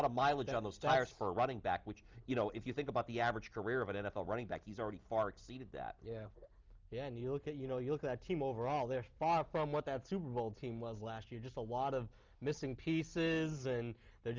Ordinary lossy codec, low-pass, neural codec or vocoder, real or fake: Opus, 32 kbps; 7.2 kHz; none; real